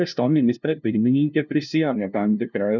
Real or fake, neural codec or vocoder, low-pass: fake; codec, 16 kHz, 0.5 kbps, FunCodec, trained on LibriTTS, 25 frames a second; 7.2 kHz